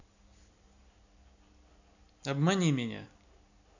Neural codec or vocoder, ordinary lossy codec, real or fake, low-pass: none; none; real; 7.2 kHz